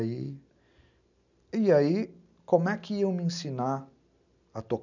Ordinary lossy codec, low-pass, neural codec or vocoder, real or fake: none; 7.2 kHz; none; real